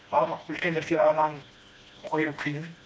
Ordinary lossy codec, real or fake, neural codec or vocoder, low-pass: none; fake; codec, 16 kHz, 1 kbps, FreqCodec, smaller model; none